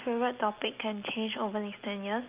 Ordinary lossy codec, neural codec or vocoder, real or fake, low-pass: Opus, 24 kbps; none; real; 3.6 kHz